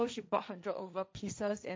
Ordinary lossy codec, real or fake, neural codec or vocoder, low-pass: none; fake; codec, 16 kHz, 1.1 kbps, Voila-Tokenizer; none